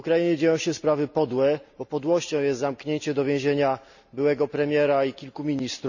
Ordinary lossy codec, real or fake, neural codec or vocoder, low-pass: none; real; none; 7.2 kHz